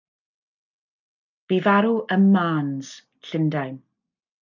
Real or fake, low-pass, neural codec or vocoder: real; 7.2 kHz; none